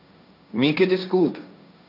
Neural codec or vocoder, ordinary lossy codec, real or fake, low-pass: codec, 16 kHz, 1.1 kbps, Voila-Tokenizer; none; fake; 5.4 kHz